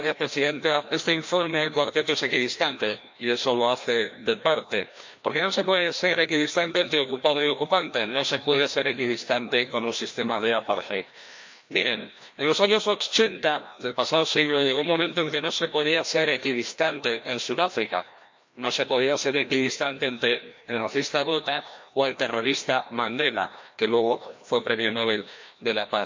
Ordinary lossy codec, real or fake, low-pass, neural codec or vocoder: MP3, 48 kbps; fake; 7.2 kHz; codec, 16 kHz, 1 kbps, FreqCodec, larger model